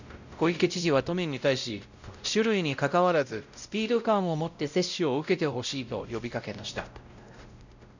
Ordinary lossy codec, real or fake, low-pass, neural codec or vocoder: none; fake; 7.2 kHz; codec, 16 kHz, 0.5 kbps, X-Codec, WavLM features, trained on Multilingual LibriSpeech